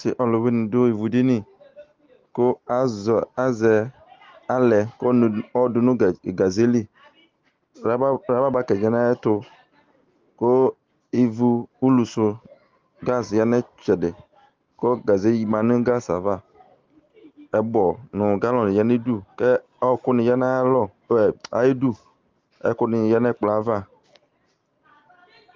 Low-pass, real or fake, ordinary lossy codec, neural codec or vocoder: 7.2 kHz; real; Opus, 32 kbps; none